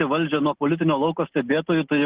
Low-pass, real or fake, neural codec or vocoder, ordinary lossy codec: 3.6 kHz; real; none; Opus, 16 kbps